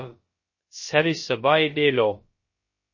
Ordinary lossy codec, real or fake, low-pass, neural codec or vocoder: MP3, 32 kbps; fake; 7.2 kHz; codec, 16 kHz, about 1 kbps, DyCAST, with the encoder's durations